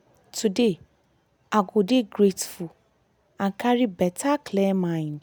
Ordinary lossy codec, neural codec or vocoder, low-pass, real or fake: none; none; none; real